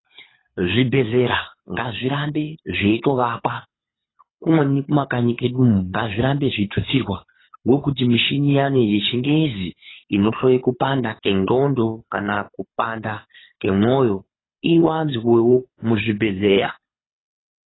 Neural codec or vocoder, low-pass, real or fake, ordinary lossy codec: codec, 24 kHz, 6 kbps, HILCodec; 7.2 kHz; fake; AAC, 16 kbps